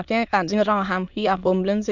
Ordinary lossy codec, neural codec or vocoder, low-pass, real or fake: none; autoencoder, 22.05 kHz, a latent of 192 numbers a frame, VITS, trained on many speakers; 7.2 kHz; fake